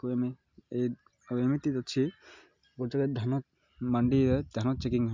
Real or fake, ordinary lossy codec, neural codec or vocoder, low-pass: real; MP3, 64 kbps; none; 7.2 kHz